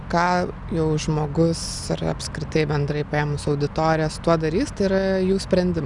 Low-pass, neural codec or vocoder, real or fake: 10.8 kHz; none; real